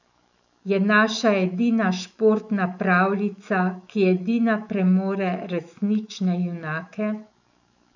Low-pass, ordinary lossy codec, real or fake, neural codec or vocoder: 7.2 kHz; none; fake; autoencoder, 48 kHz, 128 numbers a frame, DAC-VAE, trained on Japanese speech